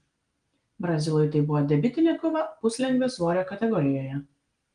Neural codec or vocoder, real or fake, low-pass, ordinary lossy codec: none; real; 9.9 kHz; Opus, 24 kbps